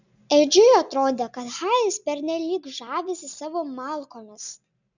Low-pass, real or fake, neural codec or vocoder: 7.2 kHz; real; none